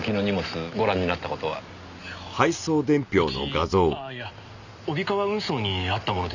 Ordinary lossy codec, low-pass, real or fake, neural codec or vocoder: none; 7.2 kHz; real; none